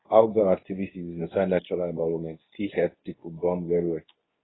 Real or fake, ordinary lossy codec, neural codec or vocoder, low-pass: fake; AAC, 16 kbps; codec, 24 kHz, 0.9 kbps, WavTokenizer, medium speech release version 2; 7.2 kHz